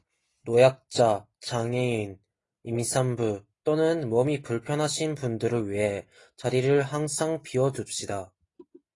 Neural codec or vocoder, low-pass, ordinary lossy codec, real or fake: none; 10.8 kHz; AAC, 32 kbps; real